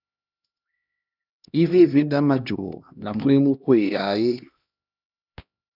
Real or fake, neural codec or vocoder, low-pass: fake; codec, 16 kHz, 1 kbps, X-Codec, HuBERT features, trained on LibriSpeech; 5.4 kHz